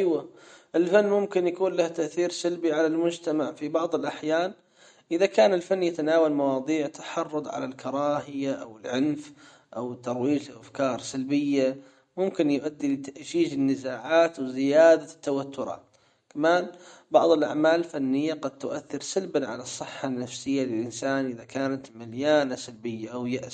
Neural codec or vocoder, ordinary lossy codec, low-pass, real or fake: none; MP3, 48 kbps; 19.8 kHz; real